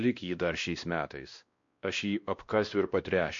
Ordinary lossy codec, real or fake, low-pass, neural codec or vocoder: MP3, 48 kbps; fake; 7.2 kHz; codec, 16 kHz, 1 kbps, X-Codec, WavLM features, trained on Multilingual LibriSpeech